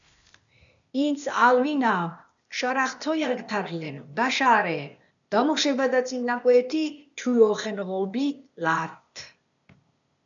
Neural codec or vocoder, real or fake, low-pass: codec, 16 kHz, 0.8 kbps, ZipCodec; fake; 7.2 kHz